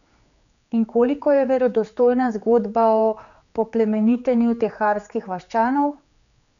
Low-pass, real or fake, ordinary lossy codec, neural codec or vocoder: 7.2 kHz; fake; none; codec, 16 kHz, 4 kbps, X-Codec, HuBERT features, trained on general audio